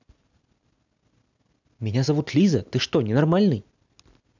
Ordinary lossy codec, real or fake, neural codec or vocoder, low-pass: none; real; none; 7.2 kHz